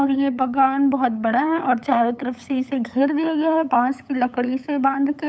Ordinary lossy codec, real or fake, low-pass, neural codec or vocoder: none; fake; none; codec, 16 kHz, 8 kbps, FunCodec, trained on LibriTTS, 25 frames a second